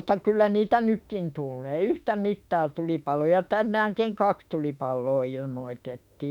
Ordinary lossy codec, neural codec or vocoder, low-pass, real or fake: none; autoencoder, 48 kHz, 32 numbers a frame, DAC-VAE, trained on Japanese speech; 19.8 kHz; fake